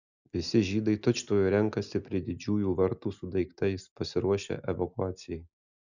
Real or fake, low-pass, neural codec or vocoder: real; 7.2 kHz; none